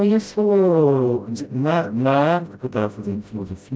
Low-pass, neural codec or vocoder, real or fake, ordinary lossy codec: none; codec, 16 kHz, 0.5 kbps, FreqCodec, smaller model; fake; none